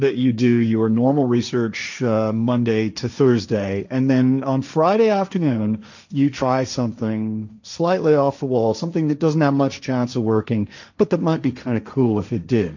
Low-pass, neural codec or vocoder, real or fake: 7.2 kHz; codec, 16 kHz, 1.1 kbps, Voila-Tokenizer; fake